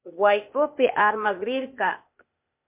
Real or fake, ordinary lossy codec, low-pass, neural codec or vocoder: fake; MP3, 32 kbps; 3.6 kHz; codec, 16 kHz, 0.8 kbps, ZipCodec